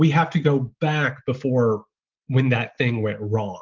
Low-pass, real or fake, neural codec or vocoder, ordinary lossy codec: 7.2 kHz; real; none; Opus, 32 kbps